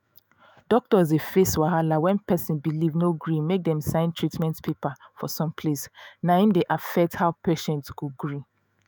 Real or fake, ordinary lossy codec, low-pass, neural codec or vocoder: fake; none; none; autoencoder, 48 kHz, 128 numbers a frame, DAC-VAE, trained on Japanese speech